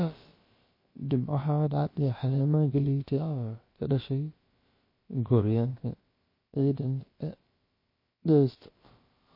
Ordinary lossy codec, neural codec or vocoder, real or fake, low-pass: MP3, 24 kbps; codec, 16 kHz, about 1 kbps, DyCAST, with the encoder's durations; fake; 5.4 kHz